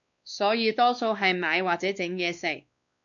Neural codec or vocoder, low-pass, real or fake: codec, 16 kHz, 2 kbps, X-Codec, WavLM features, trained on Multilingual LibriSpeech; 7.2 kHz; fake